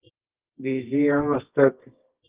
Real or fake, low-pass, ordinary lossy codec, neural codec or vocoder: fake; 3.6 kHz; Opus, 32 kbps; codec, 24 kHz, 0.9 kbps, WavTokenizer, medium music audio release